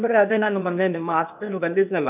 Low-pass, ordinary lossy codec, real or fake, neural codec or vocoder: 3.6 kHz; none; fake; codec, 16 kHz, 0.8 kbps, ZipCodec